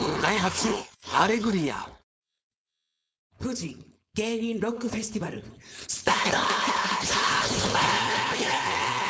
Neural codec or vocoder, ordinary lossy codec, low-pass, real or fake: codec, 16 kHz, 4.8 kbps, FACodec; none; none; fake